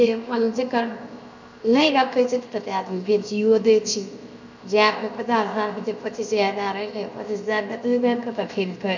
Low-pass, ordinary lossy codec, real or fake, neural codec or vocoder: 7.2 kHz; none; fake; codec, 16 kHz, 0.7 kbps, FocalCodec